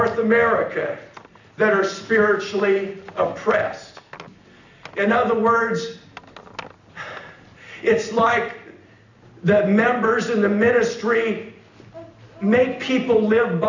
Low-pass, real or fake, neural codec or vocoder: 7.2 kHz; real; none